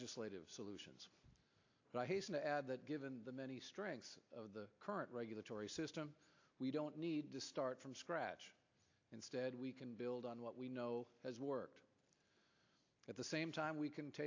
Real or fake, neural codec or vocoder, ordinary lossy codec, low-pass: real; none; AAC, 48 kbps; 7.2 kHz